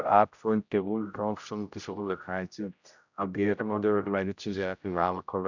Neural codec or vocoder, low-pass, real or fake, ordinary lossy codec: codec, 16 kHz, 0.5 kbps, X-Codec, HuBERT features, trained on general audio; 7.2 kHz; fake; none